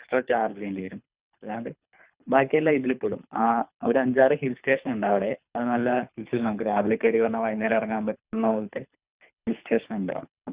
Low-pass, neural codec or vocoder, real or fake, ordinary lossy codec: 3.6 kHz; codec, 24 kHz, 3 kbps, HILCodec; fake; Opus, 64 kbps